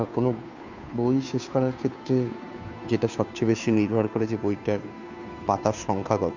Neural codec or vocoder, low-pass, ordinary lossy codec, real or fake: codec, 16 kHz, 2 kbps, FunCodec, trained on Chinese and English, 25 frames a second; 7.2 kHz; none; fake